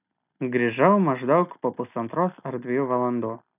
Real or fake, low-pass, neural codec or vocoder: real; 3.6 kHz; none